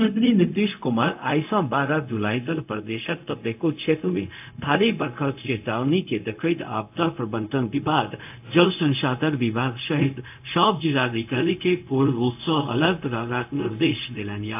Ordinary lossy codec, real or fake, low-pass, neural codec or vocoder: AAC, 32 kbps; fake; 3.6 kHz; codec, 16 kHz, 0.4 kbps, LongCat-Audio-Codec